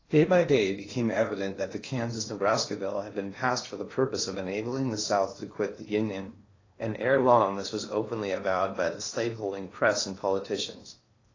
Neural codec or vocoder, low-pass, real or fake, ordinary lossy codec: codec, 16 kHz in and 24 kHz out, 0.8 kbps, FocalCodec, streaming, 65536 codes; 7.2 kHz; fake; AAC, 32 kbps